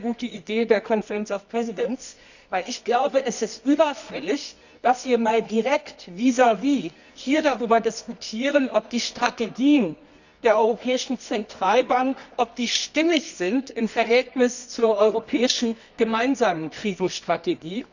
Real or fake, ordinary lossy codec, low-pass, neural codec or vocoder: fake; none; 7.2 kHz; codec, 24 kHz, 0.9 kbps, WavTokenizer, medium music audio release